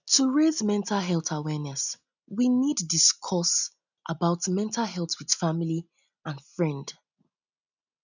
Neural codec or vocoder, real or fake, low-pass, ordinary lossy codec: none; real; 7.2 kHz; none